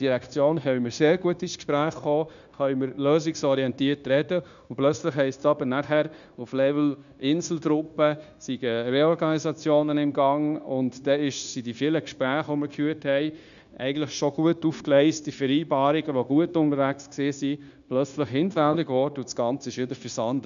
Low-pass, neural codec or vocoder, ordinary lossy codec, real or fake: 7.2 kHz; codec, 16 kHz, 0.9 kbps, LongCat-Audio-Codec; none; fake